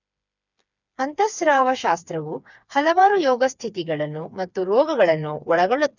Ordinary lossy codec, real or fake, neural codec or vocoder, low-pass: none; fake; codec, 16 kHz, 4 kbps, FreqCodec, smaller model; 7.2 kHz